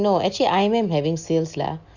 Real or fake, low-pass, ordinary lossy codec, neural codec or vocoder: real; 7.2 kHz; Opus, 64 kbps; none